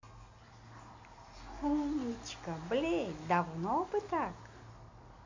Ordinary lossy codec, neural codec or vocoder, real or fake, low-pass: AAC, 48 kbps; none; real; 7.2 kHz